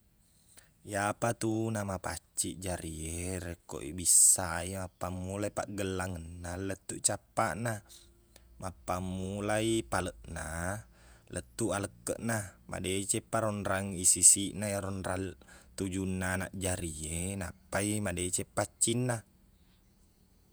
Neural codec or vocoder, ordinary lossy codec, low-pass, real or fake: vocoder, 48 kHz, 128 mel bands, Vocos; none; none; fake